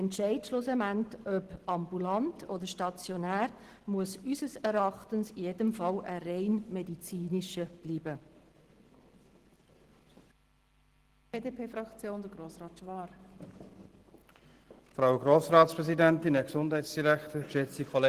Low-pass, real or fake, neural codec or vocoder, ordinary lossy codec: 14.4 kHz; real; none; Opus, 16 kbps